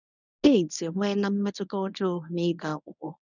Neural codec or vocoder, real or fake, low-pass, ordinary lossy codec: codec, 24 kHz, 0.9 kbps, WavTokenizer, medium speech release version 1; fake; 7.2 kHz; none